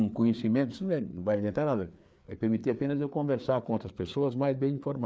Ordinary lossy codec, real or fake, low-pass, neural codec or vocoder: none; fake; none; codec, 16 kHz, 4 kbps, FreqCodec, larger model